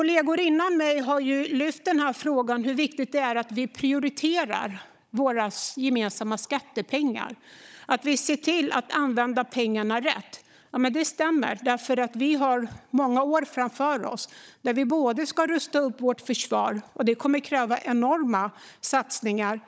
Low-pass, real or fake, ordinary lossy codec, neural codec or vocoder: none; fake; none; codec, 16 kHz, 16 kbps, FunCodec, trained on Chinese and English, 50 frames a second